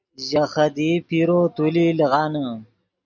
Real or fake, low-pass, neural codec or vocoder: real; 7.2 kHz; none